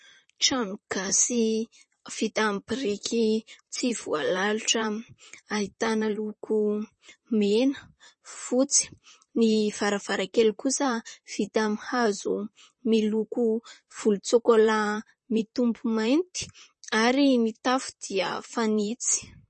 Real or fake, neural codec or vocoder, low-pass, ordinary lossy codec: fake; vocoder, 44.1 kHz, 128 mel bands, Pupu-Vocoder; 10.8 kHz; MP3, 32 kbps